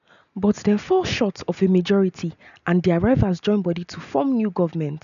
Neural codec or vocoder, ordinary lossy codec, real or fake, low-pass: none; none; real; 7.2 kHz